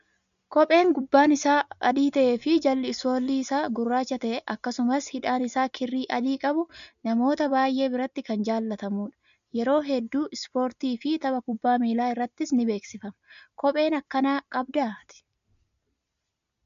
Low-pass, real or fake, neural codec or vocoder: 7.2 kHz; real; none